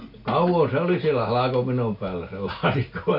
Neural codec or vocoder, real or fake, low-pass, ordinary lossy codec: none; real; 5.4 kHz; none